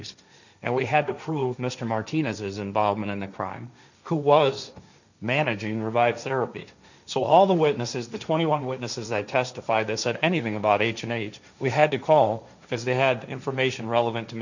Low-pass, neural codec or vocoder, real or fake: 7.2 kHz; codec, 16 kHz, 1.1 kbps, Voila-Tokenizer; fake